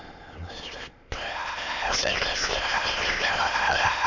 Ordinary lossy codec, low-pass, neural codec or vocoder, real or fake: none; 7.2 kHz; autoencoder, 22.05 kHz, a latent of 192 numbers a frame, VITS, trained on many speakers; fake